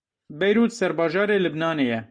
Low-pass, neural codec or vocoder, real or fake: 9.9 kHz; none; real